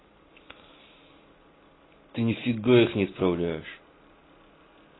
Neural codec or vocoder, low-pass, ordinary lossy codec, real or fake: none; 7.2 kHz; AAC, 16 kbps; real